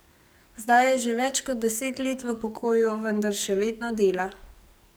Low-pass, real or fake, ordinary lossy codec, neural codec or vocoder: none; fake; none; codec, 44.1 kHz, 2.6 kbps, SNAC